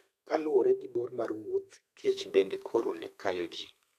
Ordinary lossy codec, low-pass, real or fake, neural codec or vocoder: none; 14.4 kHz; fake; codec, 32 kHz, 1.9 kbps, SNAC